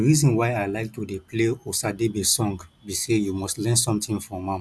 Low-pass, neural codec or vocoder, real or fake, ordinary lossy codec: none; none; real; none